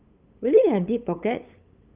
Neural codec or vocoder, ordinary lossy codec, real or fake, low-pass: codec, 16 kHz, 8 kbps, FunCodec, trained on LibriTTS, 25 frames a second; Opus, 24 kbps; fake; 3.6 kHz